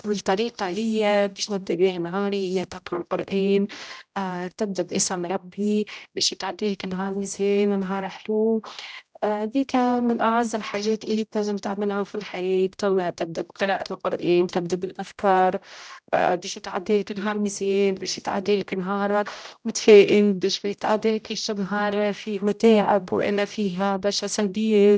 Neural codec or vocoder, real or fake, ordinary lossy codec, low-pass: codec, 16 kHz, 0.5 kbps, X-Codec, HuBERT features, trained on general audio; fake; none; none